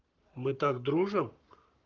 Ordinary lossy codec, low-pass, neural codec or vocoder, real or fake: Opus, 32 kbps; 7.2 kHz; codec, 44.1 kHz, 7.8 kbps, Pupu-Codec; fake